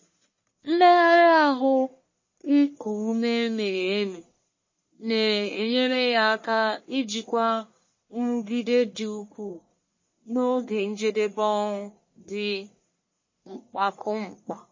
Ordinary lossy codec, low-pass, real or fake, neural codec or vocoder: MP3, 32 kbps; 7.2 kHz; fake; codec, 44.1 kHz, 1.7 kbps, Pupu-Codec